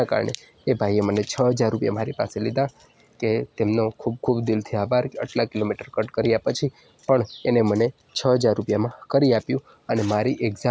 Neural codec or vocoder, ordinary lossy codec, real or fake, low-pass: none; none; real; none